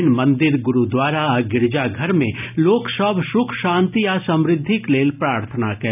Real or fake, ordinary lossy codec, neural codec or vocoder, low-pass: real; none; none; 3.6 kHz